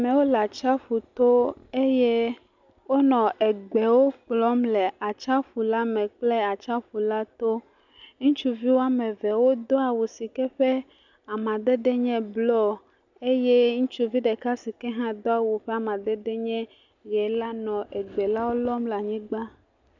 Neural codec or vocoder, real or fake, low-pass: none; real; 7.2 kHz